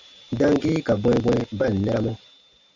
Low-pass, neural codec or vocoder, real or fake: 7.2 kHz; none; real